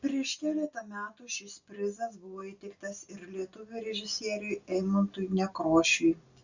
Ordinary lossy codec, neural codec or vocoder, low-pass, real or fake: Opus, 64 kbps; none; 7.2 kHz; real